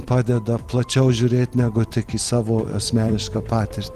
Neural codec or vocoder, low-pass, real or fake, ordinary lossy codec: vocoder, 44.1 kHz, 128 mel bands every 256 samples, BigVGAN v2; 14.4 kHz; fake; Opus, 24 kbps